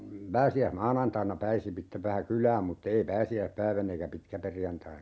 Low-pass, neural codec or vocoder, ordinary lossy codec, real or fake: none; none; none; real